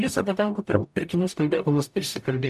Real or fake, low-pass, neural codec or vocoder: fake; 14.4 kHz; codec, 44.1 kHz, 0.9 kbps, DAC